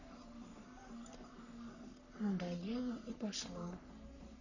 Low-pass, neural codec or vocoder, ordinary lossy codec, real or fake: 7.2 kHz; codec, 44.1 kHz, 3.4 kbps, Pupu-Codec; none; fake